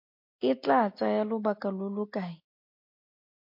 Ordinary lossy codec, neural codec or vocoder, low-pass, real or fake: MP3, 32 kbps; none; 5.4 kHz; real